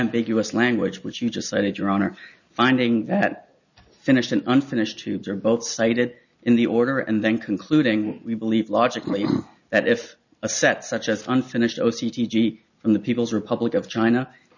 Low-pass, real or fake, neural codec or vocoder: 7.2 kHz; real; none